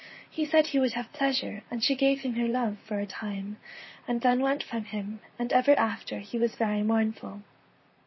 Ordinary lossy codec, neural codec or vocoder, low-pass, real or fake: MP3, 24 kbps; vocoder, 44.1 kHz, 128 mel bands, Pupu-Vocoder; 7.2 kHz; fake